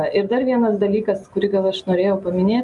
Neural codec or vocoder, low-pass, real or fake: none; 10.8 kHz; real